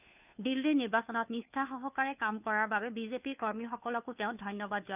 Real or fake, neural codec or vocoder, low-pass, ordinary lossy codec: fake; codec, 16 kHz, 2 kbps, FunCodec, trained on Chinese and English, 25 frames a second; 3.6 kHz; none